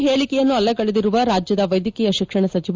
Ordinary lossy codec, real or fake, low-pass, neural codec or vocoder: Opus, 32 kbps; real; 7.2 kHz; none